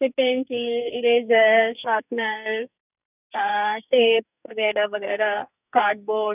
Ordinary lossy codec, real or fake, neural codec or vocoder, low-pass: none; fake; codec, 44.1 kHz, 2.6 kbps, SNAC; 3.6 kHz